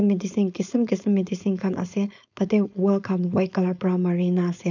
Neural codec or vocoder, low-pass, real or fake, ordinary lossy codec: codec, 16 kHz, 4.8 kbps, FACodec; 7.2 kHz; fake; none